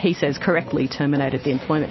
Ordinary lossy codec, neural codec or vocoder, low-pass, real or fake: MP3, 24 kbps; none; 7.2 kHz; real